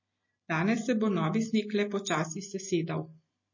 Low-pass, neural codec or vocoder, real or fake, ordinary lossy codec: 7.2 kHz; none; real; MP3, 32 kbps